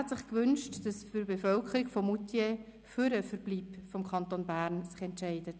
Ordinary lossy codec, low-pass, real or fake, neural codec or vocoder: none; none; real; none